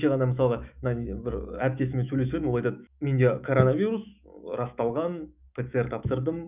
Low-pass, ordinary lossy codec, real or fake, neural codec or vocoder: 3.6 kHz; none; fake; autoencoder, 48 kHz, 128 numbers a frame, DAC-VAE, trained on Japanese speech